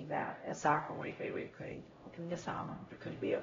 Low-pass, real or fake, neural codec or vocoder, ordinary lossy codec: 7.2 kHz; fake; codec, 16 kHz, 0.5 kbps, X-Codec, HuBERT features, trained on LibriSpeech; AAC, 24 kbps